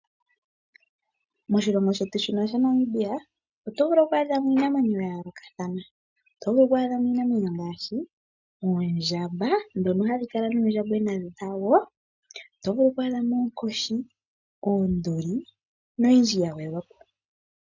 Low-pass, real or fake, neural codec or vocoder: 7.2 kHz; real; none